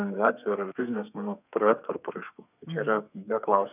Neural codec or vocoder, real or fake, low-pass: codec, 32 kHz, 1.9 kbps, SNAC; fake; 3.6 kHz